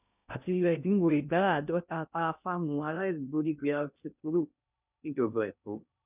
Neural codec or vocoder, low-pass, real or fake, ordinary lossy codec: codec, 16 kHz in and 24 kHz out, 0.6 kbps, FocalCodec, streaming, 2048 codes; 3.6 kHz; fake; none